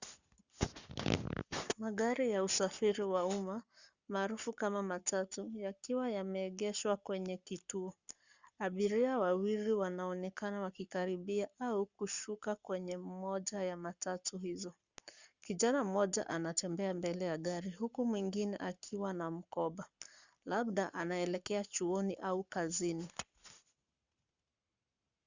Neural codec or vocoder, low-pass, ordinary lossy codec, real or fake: codec, 44.1 kHz, 7.8 kbps, Pupu-Codec; 7.2 kHz; Opus, 64 kbps; fake